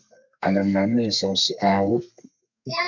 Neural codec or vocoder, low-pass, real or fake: codec, 32 kHz, 1.9 kbps, SNAC; 7.2 kHz; fake